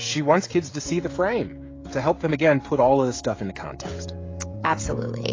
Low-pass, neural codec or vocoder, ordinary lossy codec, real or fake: 7.2 kHz; codec, 16 kHz, 16 kbps, FreqCodec, smaller model; AAC, 32 kbps; fake